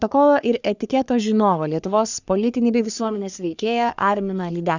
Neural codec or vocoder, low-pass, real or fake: codec, 44.1 kHz, 3.4 kbps, Pupu-Codec; 7.2 kHz; fake